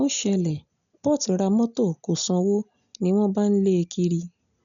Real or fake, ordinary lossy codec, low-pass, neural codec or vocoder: real; none; 7.2 kHz; none